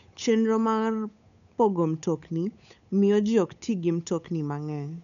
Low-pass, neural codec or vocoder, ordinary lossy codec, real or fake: 7.2 kHz; codec, 16 kHz, 8 kbps, FunCodec, trained on Chinese and English, 25 frames a second; none; fake